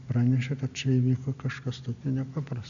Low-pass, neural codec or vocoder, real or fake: 7.2 kHz; none; real